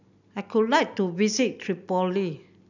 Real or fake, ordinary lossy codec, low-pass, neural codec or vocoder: real; none; 7.2 kHz; none